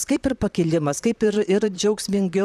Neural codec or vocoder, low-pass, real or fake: vocoder, 44.1 kHz, 128 mel bands, Pupu-Vocoder; 14.4 kHz; fake